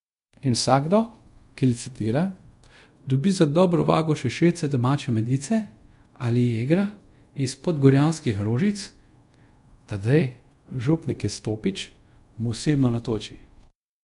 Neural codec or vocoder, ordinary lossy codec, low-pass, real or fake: codec, 24 kHz, 0.5 kbps, DualCodec; MP3, 64 kbps; 10.8 kHz; fake